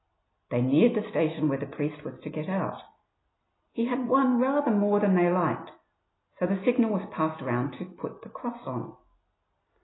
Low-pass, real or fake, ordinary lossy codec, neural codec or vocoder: 7.2 kHz; real; AAC, 16 kbps; none